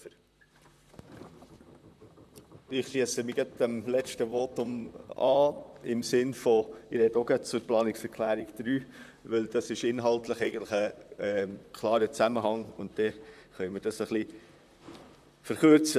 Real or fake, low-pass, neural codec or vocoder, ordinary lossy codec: fake; 14.4 kHz; vocoder, 44.1 kHz, 128 mel bands, Pupu-Vocoder; none